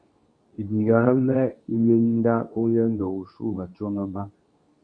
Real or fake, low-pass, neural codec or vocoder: fake; 9.9 kHz; codec, 24 kHz, 0.9 kbps, WavTokenizer, medium speech release version 2